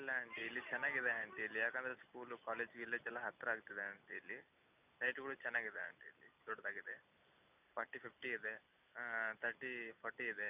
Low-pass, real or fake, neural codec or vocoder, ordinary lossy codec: 3.6 kHz; real; none; none